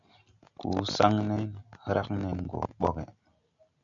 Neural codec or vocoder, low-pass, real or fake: none; 7.2 kHz; real